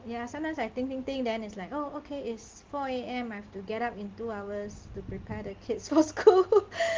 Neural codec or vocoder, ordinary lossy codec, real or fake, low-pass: none; Opus, 16 kbps; real; 7.2 kHz